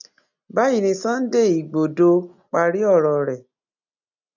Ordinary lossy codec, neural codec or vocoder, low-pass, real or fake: none; none; 7.2 kHz; real